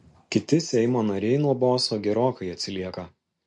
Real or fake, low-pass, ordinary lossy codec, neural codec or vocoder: real; 10.8 kHz; MP3, 48 kbps; none